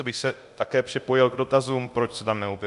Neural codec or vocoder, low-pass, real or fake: codec, 24 kHz, 0.9 kbps, DualCodec; 10.8 kHz; fake